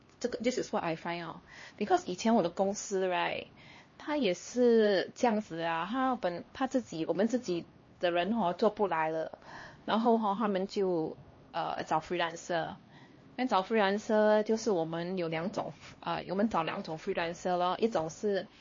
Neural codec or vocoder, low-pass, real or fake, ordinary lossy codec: codec, 16 kHz, 2 kbps, X-Codec, HuBERT features, trained on LibriSpeech; 7.2 kHz; fake; MP3, 32 kbps